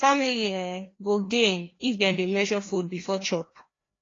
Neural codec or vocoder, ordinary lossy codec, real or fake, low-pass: codec, 16 kHz, 1 kbps, FreqCodec, larger model; AAC, 32 kbps; fake; 7.2 kHz